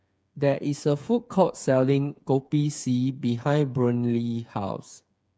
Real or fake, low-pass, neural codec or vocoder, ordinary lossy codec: fake; none; codec, 16 kHz, 8 kbps, FreqCodec, smaller model; none